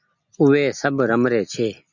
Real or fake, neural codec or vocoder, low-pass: real; none; 7.2 kHz